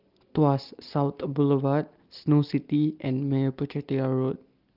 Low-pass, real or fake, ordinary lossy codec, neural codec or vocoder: 5.4 kHz; real; Opus, 32 kbps; none